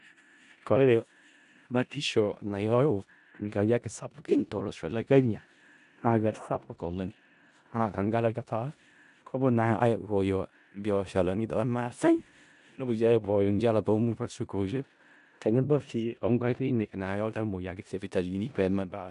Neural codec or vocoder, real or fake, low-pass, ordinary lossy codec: codec, 16 kHz in and 24 kHz out, 0.4 kbps, LongCat-Audio-Codec, four codebook decoder; fake; 10.8 kHz; none